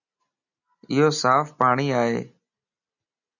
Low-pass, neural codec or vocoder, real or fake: 7.2 kHz; none; real